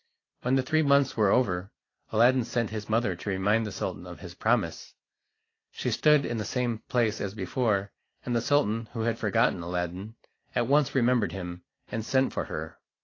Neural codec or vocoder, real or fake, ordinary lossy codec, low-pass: none; real; AAC, 32 kbps; 7.2 kHz